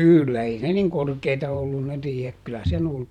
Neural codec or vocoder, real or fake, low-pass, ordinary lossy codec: vocoder, 44.1 kHz, 128 mel bands every 512 samples, BigVGAN v2; fake; 19.8 kHz; none